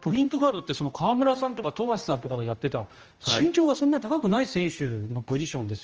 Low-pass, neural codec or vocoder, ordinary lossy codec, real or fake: 7.2 kHz; codec, 16 kHz, 1 kbps, X-Codec, HuBERT features, trained on general audio; Opus, 24 kbps; fake